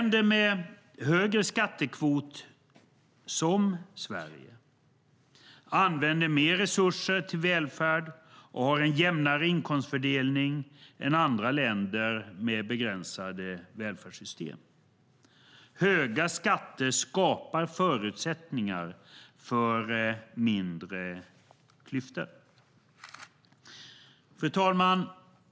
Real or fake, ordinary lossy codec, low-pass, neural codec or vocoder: real; none; none; none